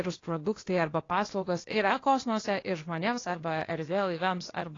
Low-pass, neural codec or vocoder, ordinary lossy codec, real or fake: 7.2 kHz; codec, 16 kHz, 0.8 kbps, ZipCodec; AAC, 32 kbps; fake